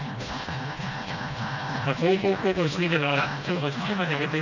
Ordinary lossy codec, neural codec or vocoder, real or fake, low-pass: none; codec, 16 kHz, 1 kbps, FreqCodec, smaller model; fake; 7.2 kHz